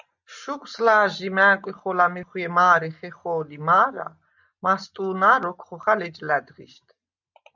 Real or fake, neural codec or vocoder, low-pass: real; none; 7.2 kHz